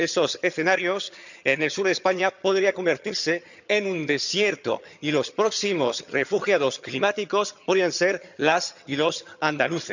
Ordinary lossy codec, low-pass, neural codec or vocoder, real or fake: none; 7.2 kHz; vocoder, 22.05 kHz, 80 mel bands, HiFi-GAN; fake